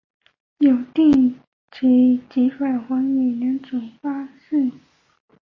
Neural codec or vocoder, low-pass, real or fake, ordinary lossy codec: none; 7.2 kHz; real; MP3, 32 kbps